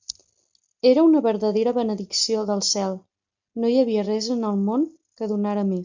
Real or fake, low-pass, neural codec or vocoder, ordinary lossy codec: real; 7.2 kHz; none; MP3, 64 kbps